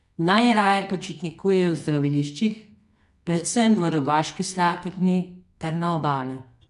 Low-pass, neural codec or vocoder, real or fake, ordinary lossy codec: 10.8 kHz; codec, 24 kHz, 0.9 kbps, WavTokenizer, medium music audio release; fake; none